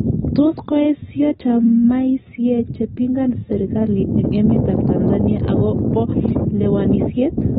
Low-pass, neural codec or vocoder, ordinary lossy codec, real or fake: 19.8 kHz; none; AAC, 16 kbps; real